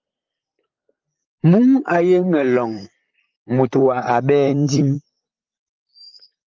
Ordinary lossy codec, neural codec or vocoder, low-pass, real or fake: Opus, 32 kbps; vocoder, 44.1 kHz, 128 mel bands, Pupu-Vocoder; 7.2 kHz; fake